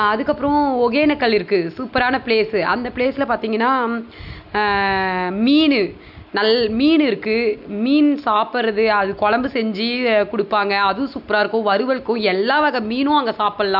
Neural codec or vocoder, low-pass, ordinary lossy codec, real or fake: none; 5.4 kHz; none; real